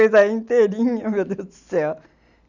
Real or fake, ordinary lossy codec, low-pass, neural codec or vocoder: real; none; 7.2 kHz; none